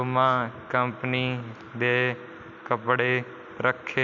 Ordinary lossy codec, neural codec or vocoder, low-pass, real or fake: none; none; 7.2 kHz; real